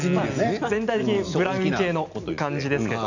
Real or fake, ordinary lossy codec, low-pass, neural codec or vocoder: real; none; 7.2 kHz; none